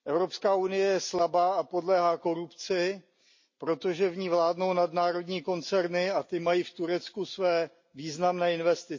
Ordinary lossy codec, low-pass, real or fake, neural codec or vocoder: MP3, 32 kbps; 7.2 kHz; real; none